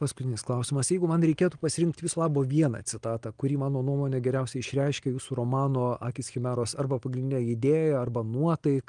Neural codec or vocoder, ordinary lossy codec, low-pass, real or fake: none; Opus, 24 kbps; 9.9 kHz; real